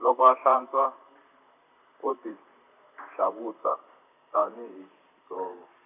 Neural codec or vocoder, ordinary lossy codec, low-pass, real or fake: vocoder, 44.1 kHz, 128 mel bands, Pupu-Vocoder; none; 3.6 kHz; fake